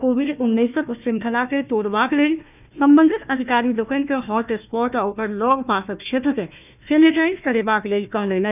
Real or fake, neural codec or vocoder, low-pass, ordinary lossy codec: fake; codec, 16 kHz, 1 kbps, FunCodec, trained on Chinese and English, 50 frames a second; 3.6 kHz; none